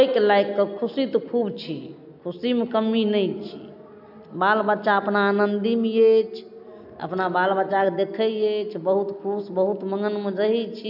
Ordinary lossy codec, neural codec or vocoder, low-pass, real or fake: none; none; 5.4 kHz; real